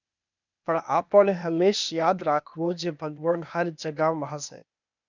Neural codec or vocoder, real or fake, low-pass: codec, 16 kHz, 0.8 kbps, ZipCodec; fake; 7.2 kHz